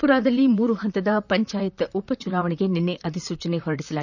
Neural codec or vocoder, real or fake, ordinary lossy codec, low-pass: vocoder, 44.1 kHz, 128 mel bands, Pupu-Vocoder; fake; none; 7.2 kHz